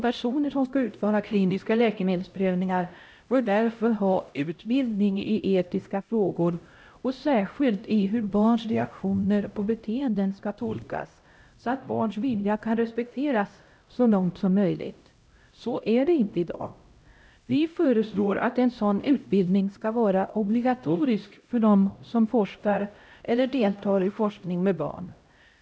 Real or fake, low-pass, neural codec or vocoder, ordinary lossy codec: fake; none; codec, 16 kHz, 0.5 kbps, X-Codec, HuBERT features, trained on LibriSpeech; none